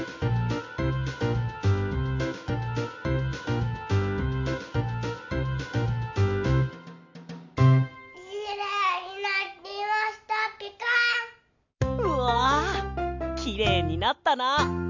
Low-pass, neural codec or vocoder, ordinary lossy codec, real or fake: 7.2 kHz; none; MP3, 64 kbps; real